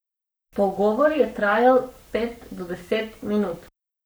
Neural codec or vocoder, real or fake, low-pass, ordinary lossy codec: codec, 44.1 kHz, 7.8 kbps, Pupu-Codec; fake; none; none